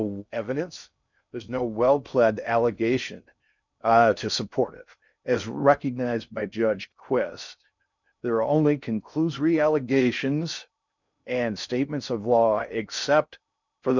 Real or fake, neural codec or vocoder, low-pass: fake; codec, 16 kHz in and 24 kHz out, 0.6 kbps, FocalCodec, streaming, 2048 codes; 7.2 kHz